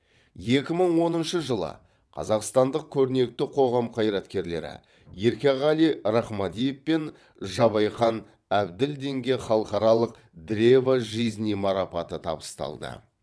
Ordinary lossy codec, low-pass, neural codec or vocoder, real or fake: none; none; vocoder, 22.05 kHz, 80 mel bands, WaveNeXt; fake